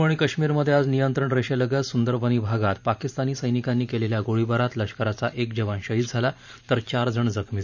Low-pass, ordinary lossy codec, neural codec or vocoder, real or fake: 7.2 kHz; none; vocoder, 44.1 kHz, 128 mel bands every 512 samples, BigVGAN v2; fake